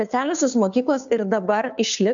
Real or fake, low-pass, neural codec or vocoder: fake; 7.2 kHz; codec, 16 kHz, 2 kbps, FunCodec, trained on Chinese and English, 25 frames a second